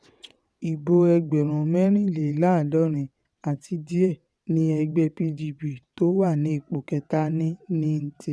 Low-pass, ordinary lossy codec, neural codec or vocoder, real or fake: none; none; vocoder, 22.05 kHz, 80 mel bands, WaveNeXt; fake